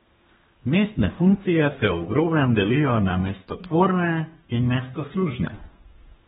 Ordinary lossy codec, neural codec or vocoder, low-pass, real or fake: AAC, 16 kbps; codec, 32 kHz, 1.9 kbps, SNAC; 14.4 kHz; fake